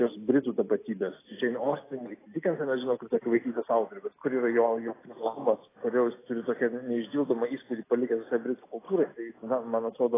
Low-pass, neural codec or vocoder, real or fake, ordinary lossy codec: 3.6 kHz; none; real; AAC, 16 kbps